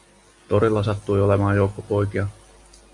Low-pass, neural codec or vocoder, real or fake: 10.8 kHz; none; real